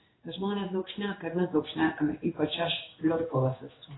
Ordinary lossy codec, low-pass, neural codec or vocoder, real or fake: AAC, 16 kbps; 7.2 kHz; codec, 16 kHz in and 24 kHz out, 1 kbps, XY-Tokenizer; fake